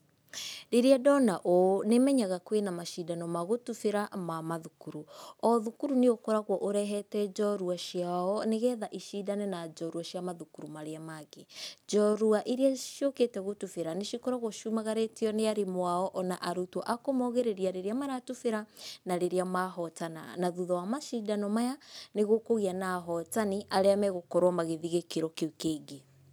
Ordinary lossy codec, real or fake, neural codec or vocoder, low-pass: none; real; none; none